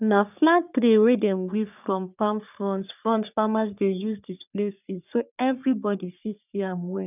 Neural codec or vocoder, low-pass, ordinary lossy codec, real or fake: codec, 44.1 kHz, 3.4 kbps, Pupu-Codec; 3.6 kHz; none; fake